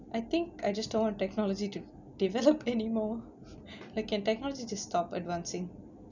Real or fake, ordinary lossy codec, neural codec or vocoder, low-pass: real; none; none; 7.2 kHz